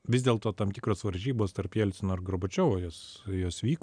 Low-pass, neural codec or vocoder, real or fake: 9.9 kHz; none; real